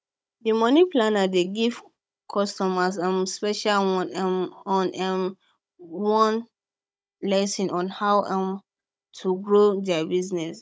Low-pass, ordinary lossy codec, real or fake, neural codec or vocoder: none; none; fake; codec, 16 kHz, 16 kbps, FunCodec, trained on Chinese and English, 50 frames a second